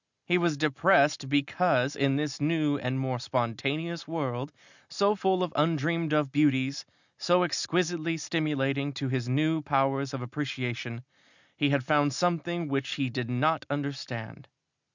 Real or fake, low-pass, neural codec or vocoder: real; 7.2 kHz; none